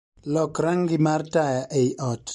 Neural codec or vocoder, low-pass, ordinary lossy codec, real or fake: none; 19.8 kHz; MP3, 48 kbps; real